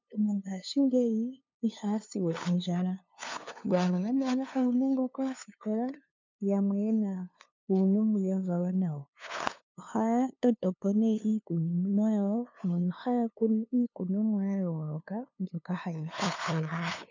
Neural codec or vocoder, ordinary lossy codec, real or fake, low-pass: codec, 16 kHz, 2 kbps, FunCodec, trained on LibriTTS, 25 frames a second; MP3, 64 kbps; fake; 7.2 kHz